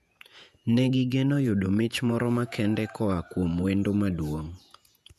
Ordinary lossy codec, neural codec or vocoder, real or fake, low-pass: none; vocoder, 48 kHz, 128 mel bands, Vocos; fake; 14.4 kHz